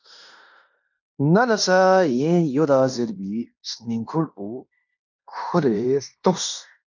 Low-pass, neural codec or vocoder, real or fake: 7.2 kHz; codec, 16 kHz in and 24 kHz out, 0.9 kbps, LongCat-Audio-Codec, fine tuned four codebook decoder; fake